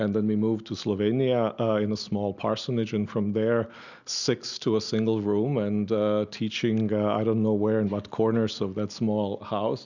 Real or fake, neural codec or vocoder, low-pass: real; none; 7.2 kHz